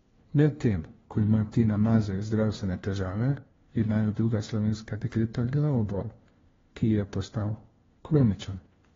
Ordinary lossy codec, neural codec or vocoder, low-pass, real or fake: AAC, 24 kbps; codec, 16 kHz, 1 kbps, FunCodec, trained on LibriTTS, 50 frames a second; 7.2 kHz; fake